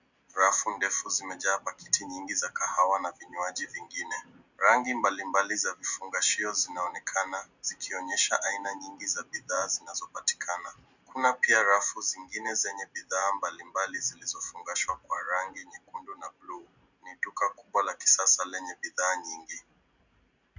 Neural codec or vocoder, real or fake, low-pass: none; real; 7.2 kHz